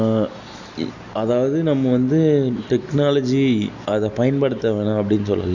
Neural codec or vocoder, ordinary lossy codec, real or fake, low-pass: none; none; real; 7.2 kHz